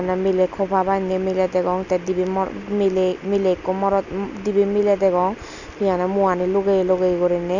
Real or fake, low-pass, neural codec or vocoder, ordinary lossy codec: real; 7.2 kHz; none; none